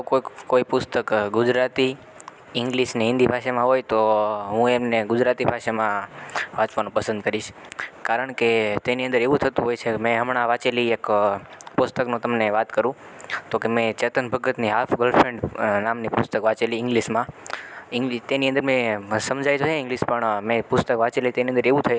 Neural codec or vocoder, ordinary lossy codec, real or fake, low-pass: none; none; real; none